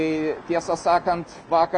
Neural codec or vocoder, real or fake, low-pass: none; real; 9.9 kHz